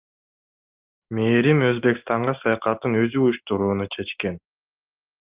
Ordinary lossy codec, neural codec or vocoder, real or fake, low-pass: Opus, 32 kbps; none; real; 3.6 kHz